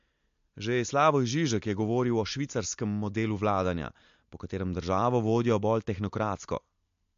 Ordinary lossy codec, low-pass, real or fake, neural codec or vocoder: MP3, 48 kbps; 7.2 kHz; real; none